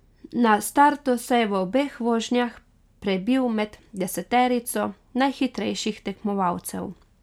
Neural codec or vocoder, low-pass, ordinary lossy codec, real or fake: none; 19.8 kHz; none; real